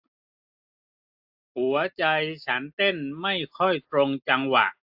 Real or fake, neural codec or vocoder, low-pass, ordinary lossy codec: real; none; 5.4 kHz; AAC, 48 kbps